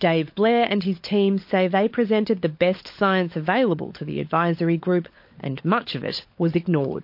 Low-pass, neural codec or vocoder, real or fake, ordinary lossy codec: 5.4 kHz; codec, 16 kHz, 16 kbps, FunCodec, trained on LibriTTS, 50 frames a second; fake; MP3, 32 kbps